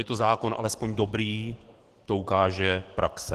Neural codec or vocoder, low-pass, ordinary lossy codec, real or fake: codec, 44.1 kHz, 7.8 kbps, DAC; 14.4 kHz; Opus, 16 kbps; fake